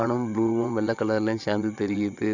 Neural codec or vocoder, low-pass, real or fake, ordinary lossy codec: vocoder, 22.05 kHz, 80 mel bands, WaveNeXt; 7.2 kHz; fake; Opus, 64 kbps